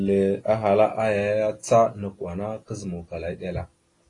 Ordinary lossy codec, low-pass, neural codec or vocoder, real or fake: AAC, 32 kbps; 10.8 kHz; none; real